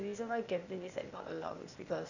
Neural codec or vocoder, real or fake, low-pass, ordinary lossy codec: codec, 16 kHz, 0.8 kbps, ZipCodec; fake; 7.2 kHz; none